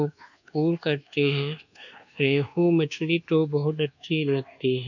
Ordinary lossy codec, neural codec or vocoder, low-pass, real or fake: none; codec, 24 kHz, 1.2 kbps, DualCodec; 7.2 kHz; fake